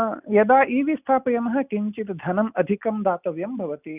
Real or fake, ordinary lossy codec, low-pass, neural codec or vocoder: real; none; 3.6 kHz; none